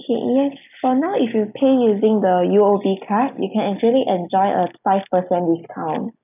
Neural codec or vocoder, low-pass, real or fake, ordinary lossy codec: none; 3.6 kHz; real; none